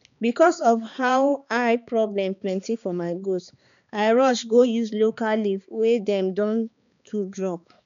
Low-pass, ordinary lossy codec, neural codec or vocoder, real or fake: 7.2 kHz; none; codec, 16 kHz, 2 kbps, X-Codec, HuBERT features, trained on balanced general audio; fake